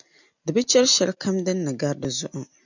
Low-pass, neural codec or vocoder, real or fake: 7.2 kHz; none; real